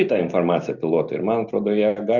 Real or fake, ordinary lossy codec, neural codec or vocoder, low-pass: real; Opus, 64 kbps; none; 7.2 kHz